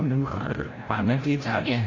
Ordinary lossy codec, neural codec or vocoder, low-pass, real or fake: AAC, 32 kbps; codec, 16 kHz, 0.5 kbps, FreqCodec, larger model; 7.2 kHz; fake